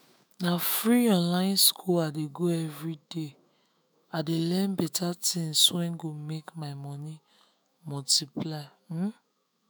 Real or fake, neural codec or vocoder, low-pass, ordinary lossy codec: fake; autoencoder, 48 kHz, 128 numbers a frame, DAC-VAE, trained on Japanese speech; none; none